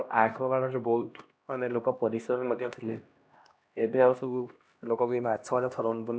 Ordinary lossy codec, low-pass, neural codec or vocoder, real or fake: none; none; codec, 16 kHz, 1 kbps, X-Codec, HuBERT features, trained on LibriSpeech; fake